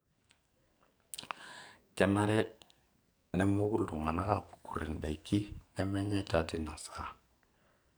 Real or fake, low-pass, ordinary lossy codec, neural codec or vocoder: fake; none; none; codec, 44.1 kHz, 2.6 kbps, SNAC